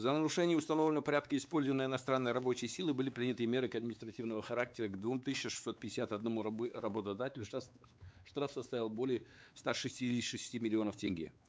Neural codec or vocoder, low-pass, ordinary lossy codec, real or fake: codec, 16 kHz, 4 kbps, X-Codec, WavLM features, trained on Multilingual LibriSpeech; none; none; fake